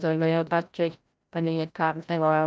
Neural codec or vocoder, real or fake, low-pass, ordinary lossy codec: codec, 16 kHz, 0.5 kbps, FreqCodec, larger model; fake; none; none